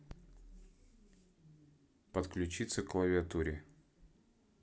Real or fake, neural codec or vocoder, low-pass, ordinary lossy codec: real; none; none; none